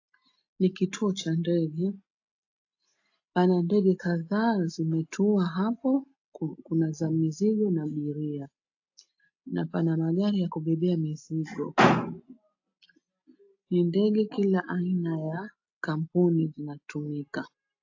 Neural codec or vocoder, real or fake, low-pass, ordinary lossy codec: none; real; 7.2 kHz; AAC, 48 kbps